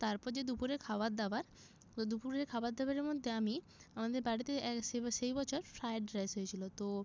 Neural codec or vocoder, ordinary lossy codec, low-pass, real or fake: none; none; none; real